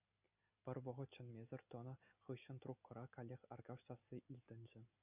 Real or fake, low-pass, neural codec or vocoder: real; 3.6 kHz; none